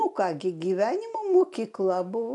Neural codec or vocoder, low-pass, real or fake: none; 10.8 kHz; real